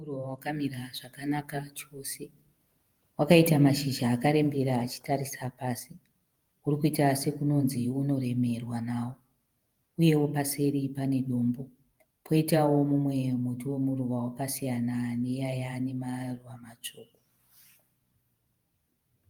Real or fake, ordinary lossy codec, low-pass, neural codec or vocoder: fake; Opus, 32 kbps; 19.8 kHz; vocoder, 48 kHz, 128 mel bands, Vocos